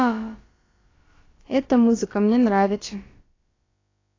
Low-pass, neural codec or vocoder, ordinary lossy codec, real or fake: 7.2 kHz; codec, 16 kHz, about 1 kbps, DyCAST, with the encoder's durations; AAC, 32 kbps; fake